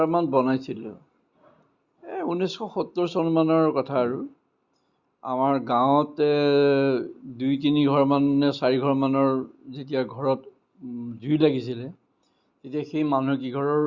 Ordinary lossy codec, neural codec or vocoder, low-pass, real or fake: Opus, 64 kbps; none; 7.2 kHz; real